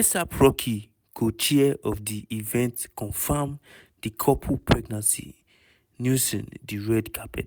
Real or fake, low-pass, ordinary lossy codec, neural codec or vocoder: real; none; none; none